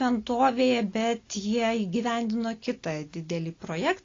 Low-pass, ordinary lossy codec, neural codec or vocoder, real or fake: 7.2 kHz; AAC, 32 kbps; none; real